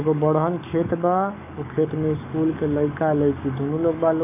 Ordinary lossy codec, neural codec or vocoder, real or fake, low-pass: none; codec, 44.1 kHz, 7.8 kbps, DAC; fake; 3.6 kHz